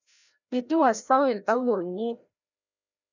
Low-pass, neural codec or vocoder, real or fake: 7.2 kHz; codec, 16 kHz, 0.5 kbps, FreqCodec, larger model; fake